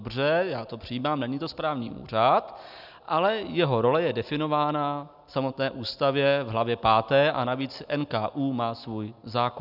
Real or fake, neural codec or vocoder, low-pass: real; none; 5.4 kHz